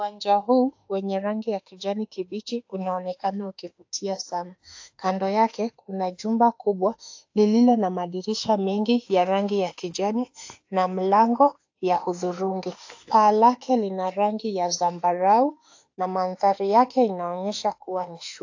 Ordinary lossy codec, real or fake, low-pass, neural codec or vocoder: AAC, 48 kbps; fake; 7.2 kHz; autoencoder, 48 kHz, 32 numbers a frame, DAC-VAE, trained on Japanese speech